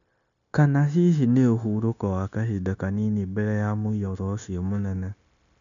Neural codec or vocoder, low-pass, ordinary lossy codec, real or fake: codec, 16 kHz, 0.9 kbps, LongCat-Audio-Codec; 7.2 kHz; none; fake